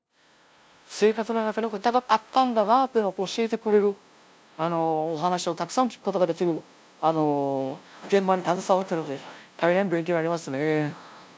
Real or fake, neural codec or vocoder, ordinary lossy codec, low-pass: fake; codec, 16 kHz, 0.5 kbps, FunCodec, trained on LibriTTS, 25 frames a second; none; none